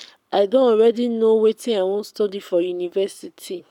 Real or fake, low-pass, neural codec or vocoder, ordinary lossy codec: fake; 19.8 kHz; codec, 44.1 kHz, 7.8 kbps, Pupu-Codec; none